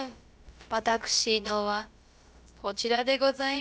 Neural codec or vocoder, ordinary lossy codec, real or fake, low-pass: codec, 16 kHz, about 1 kbps, DyCAST, with the encoder's durations; none; fake; none